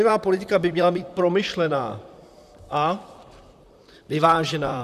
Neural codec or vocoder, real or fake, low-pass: vocoder, 44.1 kHz, 128 mel bands, Pupu-Vocoder; fake; 14.4 kHz